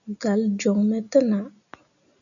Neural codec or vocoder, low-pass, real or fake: none; 7.2 kHz; real